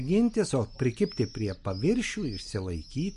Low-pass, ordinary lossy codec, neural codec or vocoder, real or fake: 14.4 kHz; MP3, 48 kbps; none; real